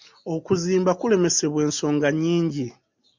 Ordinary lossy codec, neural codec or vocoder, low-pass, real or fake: MP3, 64 kbps; none; 7.2 kHz; real